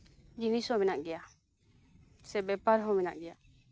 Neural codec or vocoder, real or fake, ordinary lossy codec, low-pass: none; real; none; none